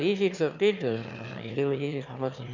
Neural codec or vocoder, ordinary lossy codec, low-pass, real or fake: autoencoder, 22.05 kHz, a latent of 192 numbers a frame, VITS, trained on one speaker; none; 7.2 kHz; fake